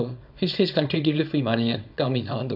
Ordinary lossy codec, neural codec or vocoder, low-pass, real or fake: none; codec, 24 kHz, 0.9 kbps, WavTokenizer, small release; 5.4 kHz; fake